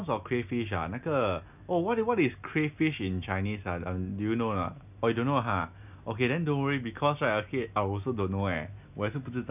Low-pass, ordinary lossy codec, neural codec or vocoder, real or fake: 3.6 kHz; none; none; real